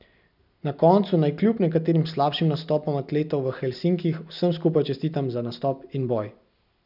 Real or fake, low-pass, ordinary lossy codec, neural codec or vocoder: real; 5.4 kHz; none; none